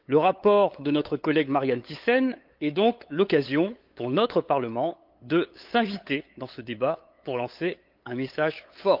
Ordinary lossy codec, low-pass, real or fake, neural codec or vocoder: Opus, 32 kbps; 5.4 kHz; fake; codec, 16 kHz, 8 kbps, FunCodec, trained on LibriTTS, 25 frames a second